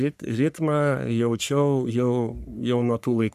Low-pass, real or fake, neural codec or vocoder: 14.4 kHz; fake; codec, 44.1 kHz, 3.4 kbps, Pupu-Codec